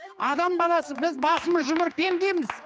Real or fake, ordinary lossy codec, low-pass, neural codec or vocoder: fake; none; none; codec, 16 kHz, 2 kbps, X-Codec, HuBERT features, trained on general audio